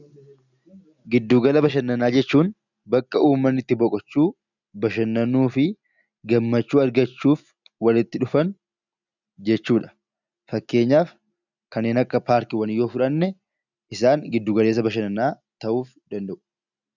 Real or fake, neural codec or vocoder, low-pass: real; none; 7.2 kHz